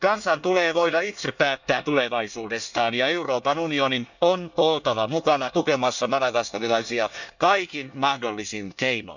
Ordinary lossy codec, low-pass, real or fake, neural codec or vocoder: none; 7.2 kHz; fake; codec, 24 kHz, 1 kbps, SNAC